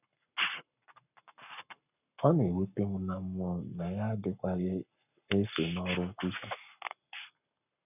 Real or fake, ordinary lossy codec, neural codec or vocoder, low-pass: fake; none; codec, 44.1 kHz, 7.8 kbps, Pupu-Codec; 3.6 kHz